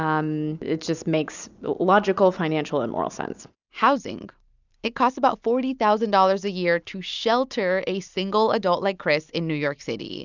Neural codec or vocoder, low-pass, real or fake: none; 7.2 kHz; real